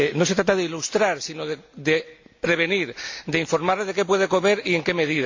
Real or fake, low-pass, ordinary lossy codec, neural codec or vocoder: real; 7.2 kHz; MP3, 48 kbps; none